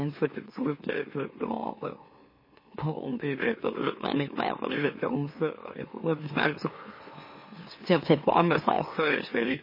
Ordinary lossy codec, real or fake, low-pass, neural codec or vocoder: MP3, 24 kbps; fake; 5.4 kHz; autoencoder, 44.1 kHz, a latent of 192 numbers a frame, MeloTTS